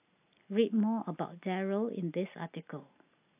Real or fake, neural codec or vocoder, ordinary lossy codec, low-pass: real; none; AAC, 32 kbps; 3.6 kHz